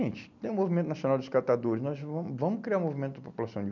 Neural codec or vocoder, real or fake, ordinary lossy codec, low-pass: none; real; none; 7.2 kHz